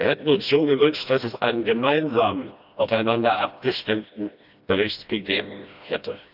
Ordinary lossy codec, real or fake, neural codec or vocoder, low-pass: none; fake; codec, 16 kHz, 1 kbps, FreqCodec, smaller model; 5.4 kHz